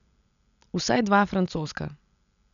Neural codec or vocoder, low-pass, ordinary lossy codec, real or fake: none; 7.2 kHz; none; real